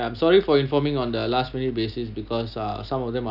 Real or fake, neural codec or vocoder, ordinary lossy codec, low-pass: real; none; none; 5.4 kHz